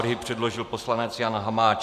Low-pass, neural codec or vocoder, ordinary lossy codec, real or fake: 14.4 kHz; none; MP3, 96 kbps; real